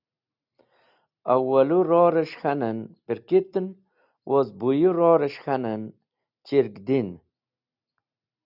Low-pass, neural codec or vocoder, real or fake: 5.4 kHz; none; real